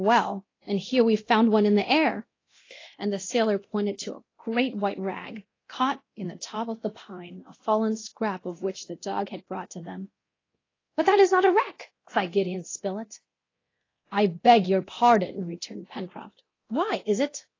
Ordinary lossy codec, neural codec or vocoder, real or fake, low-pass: AAC, 32 kbps; codec, 24 kHz, 0.9 kbps, DualCodec; fake; 7.2 kHz